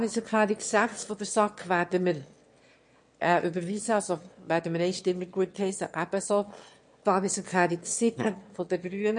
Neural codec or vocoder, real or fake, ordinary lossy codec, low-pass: autoencoder, 22.05 kHz, a latent of 192 numbers a frame, VITS, trained on one speaker; fake; MP3, 48 kbps; 9.9 kHz